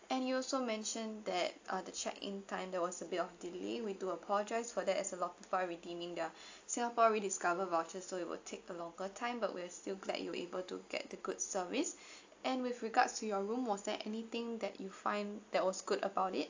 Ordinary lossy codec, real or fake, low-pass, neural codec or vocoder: AAC, 48 kbps; real; 7.2 kHz; none